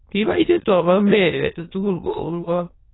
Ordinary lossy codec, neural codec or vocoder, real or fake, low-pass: AAC, 16 kbps; autoencoder, 22.05 kHz, a latent of 192 numbers a frame, VITS, trained on many speakers; fake; 7.2 kHz